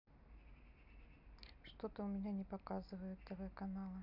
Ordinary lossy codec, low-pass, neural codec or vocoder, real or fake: none; 5.4 kHz; none; real